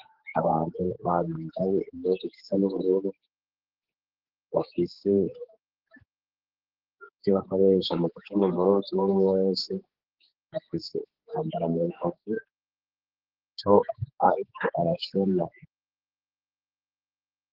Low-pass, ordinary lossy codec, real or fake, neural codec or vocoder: 5.4 kHz; Opus, 16 kbps; fake; codec, 16 kHz, 4 kbps, X-Codec, HuBERT features, trained on general audio